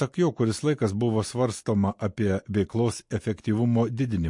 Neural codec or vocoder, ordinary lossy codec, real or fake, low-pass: none; MP3, 48 kbps; real; 10.8 kHz